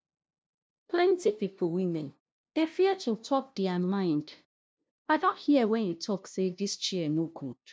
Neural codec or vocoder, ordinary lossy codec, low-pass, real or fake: codec, 16 kHz, 0.5 kbps, FunCodec, trained on LibriTTS, 25 frames a second; none; none; fake